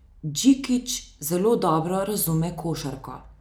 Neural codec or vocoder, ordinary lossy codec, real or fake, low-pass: none; none; real; none